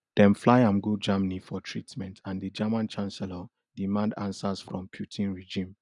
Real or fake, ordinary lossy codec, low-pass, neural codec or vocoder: real; none; 10.8 kHz; none